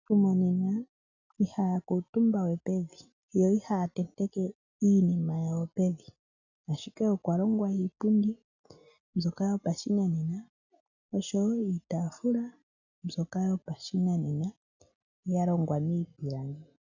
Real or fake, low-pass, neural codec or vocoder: real; 7.2 kHz; none